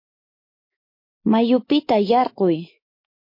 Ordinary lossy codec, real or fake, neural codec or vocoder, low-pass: MP3, 32 kbps; fake; autoencoder, 48 kHz, 128 numbers a frame, DAC-VAE, trained on Japanese speech; 5.4 kHz